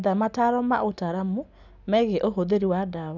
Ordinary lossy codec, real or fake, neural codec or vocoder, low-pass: none; real; none; 7.2 kHz